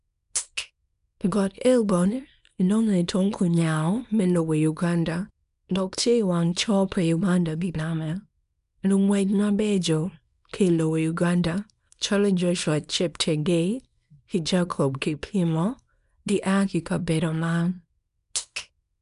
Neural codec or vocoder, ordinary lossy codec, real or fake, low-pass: codec, 24 kHz, 0.9 kbps, WavTokenizer, small release; none; fake; 10.8 kHz